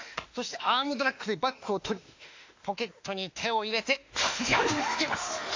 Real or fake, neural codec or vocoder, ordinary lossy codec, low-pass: fake; autoencoder, 48 kHz, 32 numbers a frame, DAC-VAE, trained on Japanese speech; AAC, 48 kbps; 7.2 kHz